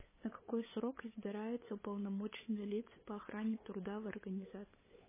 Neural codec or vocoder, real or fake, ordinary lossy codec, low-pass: codec, 16 kHz, 8 kbps, FunCodec, trained on LibriTTS, 25 frames a second; fake; MP3, 16 kbps; 3.6 kHz